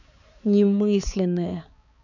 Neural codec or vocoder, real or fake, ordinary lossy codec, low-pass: codec, 16 kHz, 4 kbps, X-Codec, HuBERT features, trained on balanced general audio; fake; none; 7.2 kHz